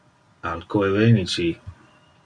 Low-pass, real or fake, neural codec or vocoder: 9.9 kHz; real; none